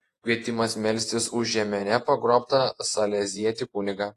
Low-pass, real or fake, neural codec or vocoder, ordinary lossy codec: 14.4 kHz; fake; vocoder, 48 kHz, 128 mel bands, Vocos; AAC, 48 kbps